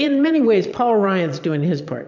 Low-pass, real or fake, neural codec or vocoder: 7.2 kHz; fake; vocoder, 22.05 kHz, 80 mel bands, Vocos